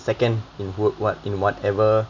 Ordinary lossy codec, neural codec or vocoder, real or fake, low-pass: none; none; real; 7.2 kHz